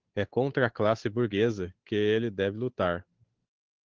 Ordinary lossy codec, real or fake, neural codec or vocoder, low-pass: Opus, 16 kbps; fake; codec, 16 kHz, 8 kbps, FunCodec, trained on Chinese and English, 25 frames a second; 7.2 kHz